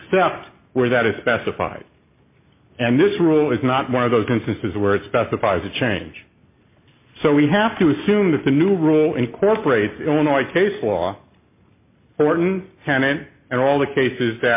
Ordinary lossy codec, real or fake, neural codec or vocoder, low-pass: MP3, 24 kbps; real; none; 3.6 kHz